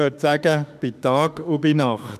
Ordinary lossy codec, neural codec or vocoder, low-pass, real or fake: none; autoencoder, 48 kHz, 32 numbers a frame, DAC-VAE, trained on Japanese speech; 14.4 kHz; fake